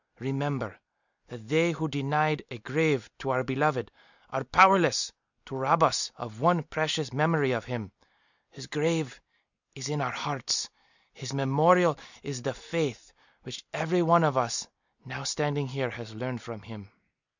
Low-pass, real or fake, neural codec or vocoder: 7.2 kHz; real; none